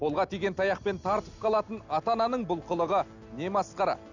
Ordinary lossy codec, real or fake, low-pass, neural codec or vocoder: none; real; 7.2 kHz; none